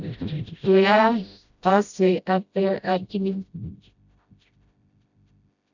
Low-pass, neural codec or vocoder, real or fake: 7.2 kHz; codec, 16 kHz, 0.5 kbps, FreqCodec, smaller model; fake